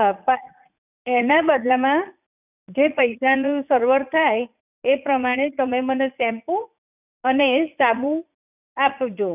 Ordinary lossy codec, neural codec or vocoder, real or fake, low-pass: none; vocoder, 44.1 kHz, 80 mel bands, Vocos; fake; 3.6 kHz